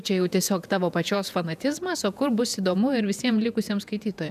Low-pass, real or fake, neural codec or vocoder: 14.4 kHz; fake; vocoder, 48 kHz, 128 mel bands, Vocos